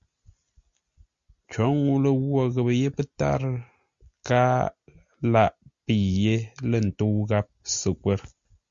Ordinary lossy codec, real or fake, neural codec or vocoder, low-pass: Opus, 64 kbps; real; none; 7.2 kHz